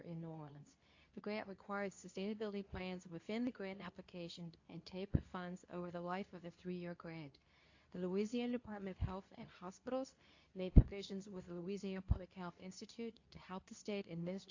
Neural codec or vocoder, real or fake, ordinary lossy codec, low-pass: codec, 24 kHz, 0.9 kbps, WavTokenizer, medium speech release version 2; fake; AAC, 48 kbps; 7.2 kHz